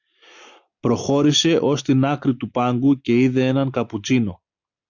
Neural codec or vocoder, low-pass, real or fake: none; 7.2 kHz; real